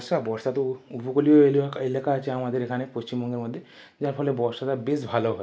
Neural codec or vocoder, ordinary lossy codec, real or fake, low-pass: none; none; real; none